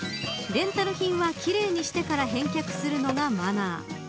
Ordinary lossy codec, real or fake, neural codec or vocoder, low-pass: none; real; none; none